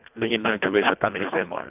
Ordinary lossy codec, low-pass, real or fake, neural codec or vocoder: none; 3.6 kHz; fake; codec, 24 kHz, 1.5 kbps, HILCodec